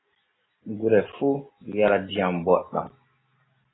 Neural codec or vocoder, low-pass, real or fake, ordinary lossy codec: none; 7.2 kHz; real; AAC, 16 kbps